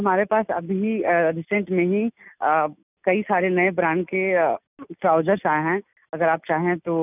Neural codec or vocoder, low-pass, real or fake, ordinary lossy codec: none; 3.6 kHz; real; none